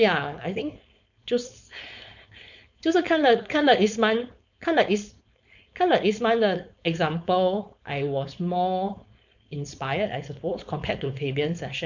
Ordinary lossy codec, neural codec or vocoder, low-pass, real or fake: none; codec, 16 kHz, 4.8 kbps, FACodec; 7.2 kHz; fake